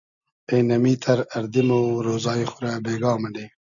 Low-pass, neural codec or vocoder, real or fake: 7.2 kHz; none; real